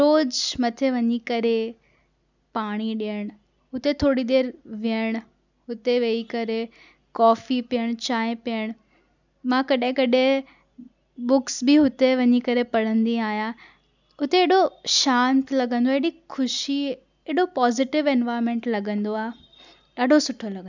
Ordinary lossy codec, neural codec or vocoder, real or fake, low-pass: none; none; real; 7.2 kHz